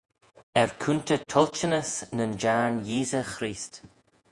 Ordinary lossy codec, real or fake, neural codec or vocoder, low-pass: Opus, 64 kbps; fake; vocoder, 48 kHz, 128 mel bands, Vocos; 10.8 kHz